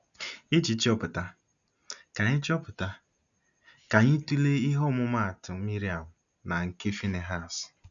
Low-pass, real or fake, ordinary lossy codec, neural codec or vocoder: 7.2 kHz; real; none; none